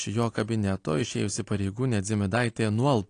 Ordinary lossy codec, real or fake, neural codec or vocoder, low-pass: AAC, 48 kbps; real; none; 9.9 kHz